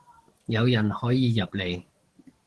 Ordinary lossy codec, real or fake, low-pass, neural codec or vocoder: Opus, 16 kbps; fake; 10.8 kHz; codec, 44.1 kHz, 7.8 kbps, DAC